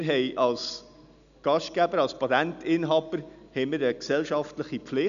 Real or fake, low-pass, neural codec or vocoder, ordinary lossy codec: real; 7.2 kHz; none; none